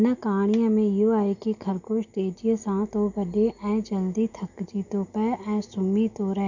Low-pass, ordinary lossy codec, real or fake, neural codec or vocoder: 7.2 kHz; none; real; none